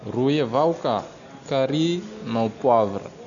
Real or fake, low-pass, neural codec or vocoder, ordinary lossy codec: real; 7.2 kHz; none; none